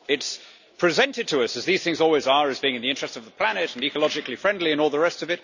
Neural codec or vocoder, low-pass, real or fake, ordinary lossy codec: none; 7.2 kHz; real; none